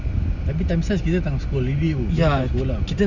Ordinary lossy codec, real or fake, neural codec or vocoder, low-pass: none; real; none; 7.2 kHz